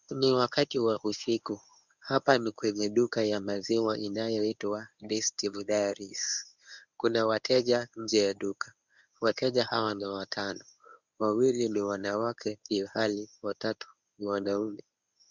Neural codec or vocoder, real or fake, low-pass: codec, 24 kHz, 0.9 kbps, WavTokenizer, medium speech release version 1; fake; 7.2 kHz